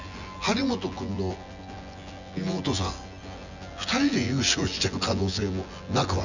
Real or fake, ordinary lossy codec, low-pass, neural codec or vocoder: fake; none; 7.2 kHz; vocoder, 24 kHz, 100 mel bands, Vocos